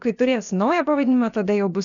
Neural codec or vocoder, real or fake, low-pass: codec, 16 kHz, about 1 kbps, DyCAST, with the encoder's durations; fake; 7.2 kHz